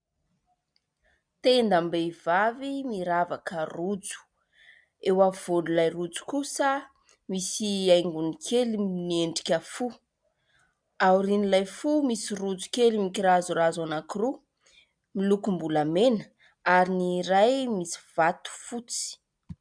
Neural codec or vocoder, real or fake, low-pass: none; real; 9.9 kHz